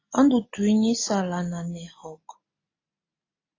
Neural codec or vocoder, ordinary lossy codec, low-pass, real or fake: none; AAC, 32 kbps; 7.2 kHz; real